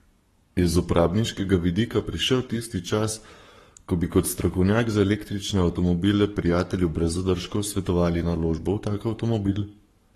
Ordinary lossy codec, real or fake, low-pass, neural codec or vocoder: AAC, 32 kbps; fake; 19.8 kHz; codec, 44.1 kHz, 7.8 kbps, Pupu-Codec